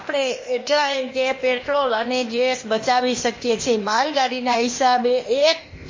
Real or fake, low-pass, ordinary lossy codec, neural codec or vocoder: fake; 7.2 kHz; MP3, 32 kbps; codec, 16 kHz, 0.8 kbps, ZipCodec